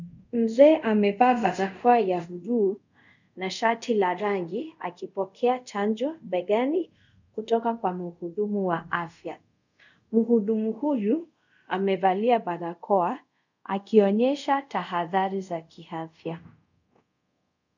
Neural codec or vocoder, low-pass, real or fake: codec, 24 kHz, 0.5 kbps, DualCodec; 7.2 kHz; fake